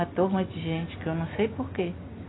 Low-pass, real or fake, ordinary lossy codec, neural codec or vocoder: 7.2 kHz; real; AAC, 16 kbps; none